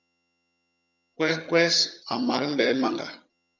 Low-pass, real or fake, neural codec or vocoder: 7.2 kHz; fake; vocoder, 22.05 kHz, 80 mel bands, HiFi-GAN